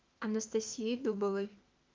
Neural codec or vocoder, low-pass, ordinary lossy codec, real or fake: autoencoder, 48 kHz, 32 numbers a frame, DAC-VAE, trained on Japanese speech; 7.2 kHz; Opus, 24 kbps; fake